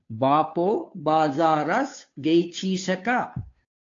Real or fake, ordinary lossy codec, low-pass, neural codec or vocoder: fake; AAC, 48 kbps; 7.2 kHz; codec, 16 kHz, 2 kbps, FunCodec, trained on Chinese and English, 25 frames a second